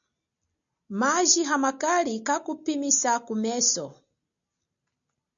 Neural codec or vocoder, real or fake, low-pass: none; real; 7.2 kHz